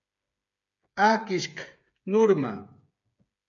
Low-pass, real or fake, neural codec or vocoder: 7.2 kHz; fake; codec, 16 kHz, 8 kbps, FreqCodec, smaller model